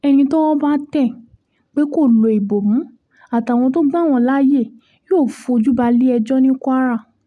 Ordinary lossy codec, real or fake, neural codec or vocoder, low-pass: none; real; none; none